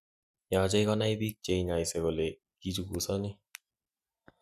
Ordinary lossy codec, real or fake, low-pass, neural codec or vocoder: none; real; 14.4 kHz; none